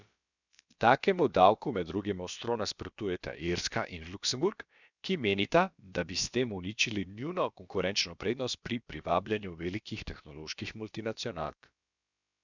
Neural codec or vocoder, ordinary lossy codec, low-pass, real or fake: codec, 16 kHz, about 1 kbps, DyCAST, with the encoder's durations; none; 7.2 kHz; fake